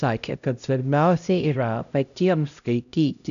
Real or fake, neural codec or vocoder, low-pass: fake; codec, 16 kHz, 0.5 kbps, X-Codec, HuBERT features, trained on LibriSpeech; 7.2 kHz